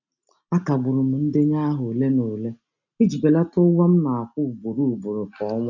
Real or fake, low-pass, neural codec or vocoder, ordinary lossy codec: real; 7.2 kHz; none; none